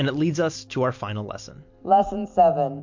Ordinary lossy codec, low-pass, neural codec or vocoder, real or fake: MP3, 48 kbps; 7.2 kHz; none; real